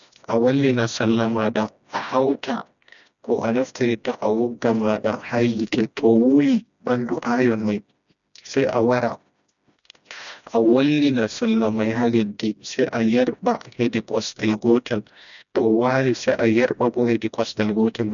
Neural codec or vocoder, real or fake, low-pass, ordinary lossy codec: codec, 16 kHz, 1 kbps, FreqCodec, smaller model; fake; 7.2 kHz; none